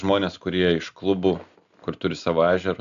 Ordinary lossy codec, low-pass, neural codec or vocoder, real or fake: AAC, 96 kbps; 7.2 kHz; none; real